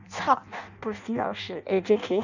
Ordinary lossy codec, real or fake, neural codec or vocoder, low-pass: none; fake; codec, 16 kHz in and 24 kHz out, 0.6 kbps, FireRedTTS-2 codec; 7.2 kHz